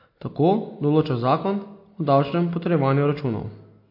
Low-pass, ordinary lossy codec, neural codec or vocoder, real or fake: 5.4 kHz; MP3, 32 kbps; none; real